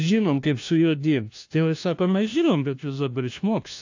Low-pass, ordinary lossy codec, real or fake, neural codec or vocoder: 7.2 kHz; AAC, 48 kbps; fake; codec, 16 kHz, 1 kbps, FunCodec, trained on LibriTTS, 50 frames a second